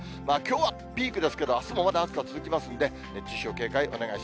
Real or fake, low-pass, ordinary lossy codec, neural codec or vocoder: real; none; none; none